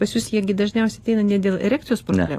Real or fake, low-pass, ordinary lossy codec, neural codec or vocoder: real; 14.4 kHz; AAC, 48 kbps; none